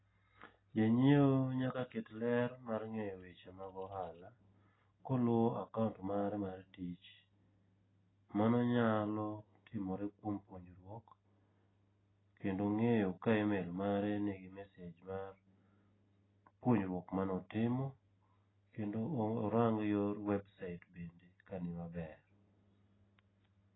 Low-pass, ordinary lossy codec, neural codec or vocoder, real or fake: 7.2 kHz; AAC, 16 kbps; none; real